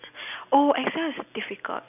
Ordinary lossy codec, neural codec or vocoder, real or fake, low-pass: none; none; real; 3.6 kHz